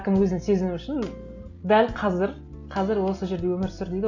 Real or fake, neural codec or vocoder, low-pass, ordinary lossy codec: real; none; 7.2 kHz; none